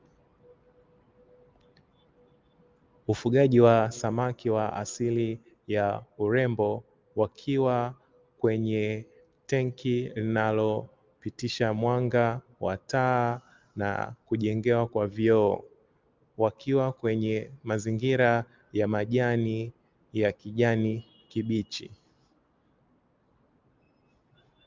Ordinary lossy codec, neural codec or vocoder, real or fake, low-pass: Opus, 24 kbps; none; real; 7.2 kHz